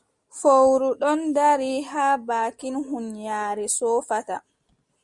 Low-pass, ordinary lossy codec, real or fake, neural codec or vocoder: 10.8 kHz; Opus, 64 kbps; real; none